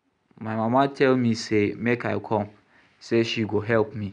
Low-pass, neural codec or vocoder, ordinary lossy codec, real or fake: 9.9 kHz; none; none; real